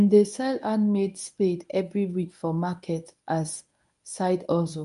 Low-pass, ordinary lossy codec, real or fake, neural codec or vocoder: 10.8 kHz; none; fake; codec, 24 kHz, 0.9 kbps, WavTokenizer, medium speech release version 1